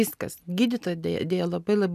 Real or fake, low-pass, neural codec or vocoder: real; 14.4 kHz; none